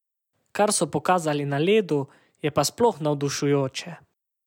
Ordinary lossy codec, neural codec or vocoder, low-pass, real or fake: none; none; 19.8 kHz; real